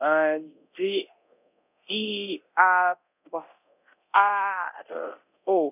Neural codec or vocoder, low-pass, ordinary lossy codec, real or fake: codec, 24 kHz, 0.9 kbps, DualCodec; 3.6 kHz; none; fake